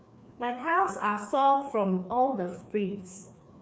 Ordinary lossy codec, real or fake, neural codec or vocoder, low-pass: none; fake; codec, 16 kHz, 2 kbps, FreqCodec, larger model; none